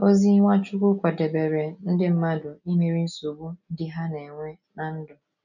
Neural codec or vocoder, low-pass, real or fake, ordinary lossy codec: none; 7.2 kHz; real; none